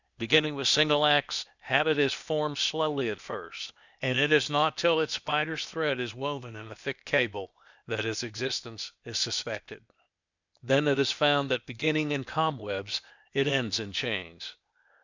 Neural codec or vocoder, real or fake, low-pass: codec, 16 kHz, 0.8 kbps, ZipCodec; fake; 7.2 kHz